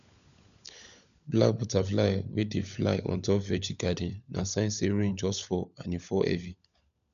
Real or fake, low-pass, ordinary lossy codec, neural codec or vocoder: fake; 7.2 kHz; none; codec, 16 kHz, 16 kbps, FunCodec, trained on LibriTTS, 50 frames a second